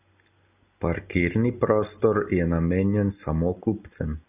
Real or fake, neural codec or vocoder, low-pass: real; none; 3.6 kHz